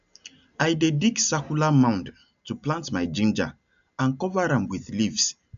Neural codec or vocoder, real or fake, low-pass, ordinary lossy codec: none; real; 7.2 kHz; MP3, 96 kbps